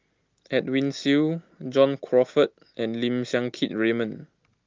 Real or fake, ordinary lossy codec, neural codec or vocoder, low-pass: real; Opus, 32 kbps; none; 7.2 kHz